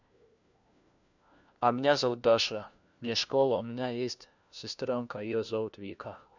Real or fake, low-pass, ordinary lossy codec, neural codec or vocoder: fake; 7.2 kHz; none; codec, 16 kHz, 1 kbps, FunCodec, trained on LibriTTS, 50 frames a second